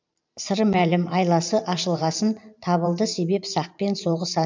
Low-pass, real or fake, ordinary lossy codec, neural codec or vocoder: 7.2 kHz; fake; MP3, 64 kbps; vocoder, 44.1 kHz, 128 mel bands every 512 samples, BigVGAN v2